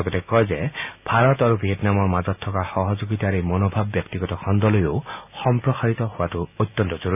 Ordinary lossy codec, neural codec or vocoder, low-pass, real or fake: none; none; 3.6 kHz; real